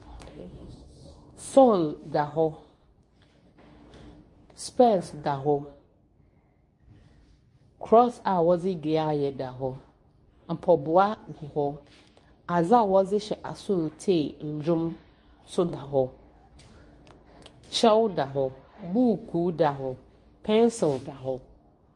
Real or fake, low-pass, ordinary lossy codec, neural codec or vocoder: fake; 10.8 kHz; MP3, 48 kbps; codec, 24 kHz, 0.9 kbps, WavTokenizer, medium speech release version 2